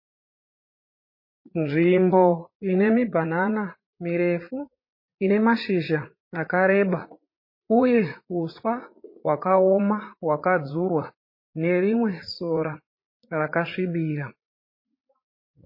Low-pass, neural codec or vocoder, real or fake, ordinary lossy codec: 5.4 kHz; vocoder, 44.1 kHz, 80 mel bands, Vocos; fake; MP3, 24 kbps